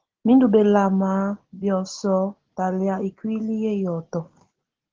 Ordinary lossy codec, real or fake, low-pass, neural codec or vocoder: Opus, 16 kbps; real; 7.2 kHz; none